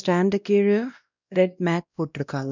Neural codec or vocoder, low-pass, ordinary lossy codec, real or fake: codec, 16 kHz, 1 kbps, X-Codec, WavLM features, trained on Multilingual LibriSpeech; 7.2 kHz; none; fake